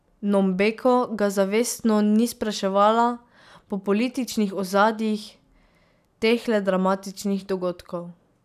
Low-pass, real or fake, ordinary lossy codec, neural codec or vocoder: 14.4 kHz; real; none; none